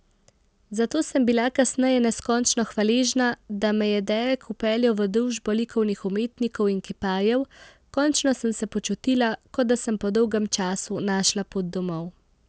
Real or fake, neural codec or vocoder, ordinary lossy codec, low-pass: real; none; none; none